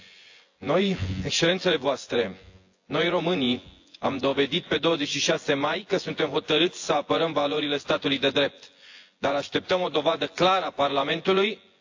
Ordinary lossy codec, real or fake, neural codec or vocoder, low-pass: AAC, 48 kbps; fake; vocoder, 24 kHz, 100 mel bands, Vocos; 7.2 kHz